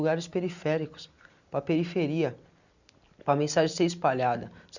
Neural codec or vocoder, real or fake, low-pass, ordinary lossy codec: none; real; 7.2 kHz; none